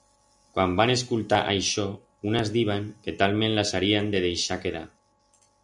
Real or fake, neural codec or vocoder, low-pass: real; none; 10.8 kHz